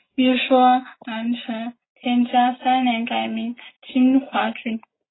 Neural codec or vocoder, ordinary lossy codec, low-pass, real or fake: none; AAC, 16 kbps; 7.2 kHz; real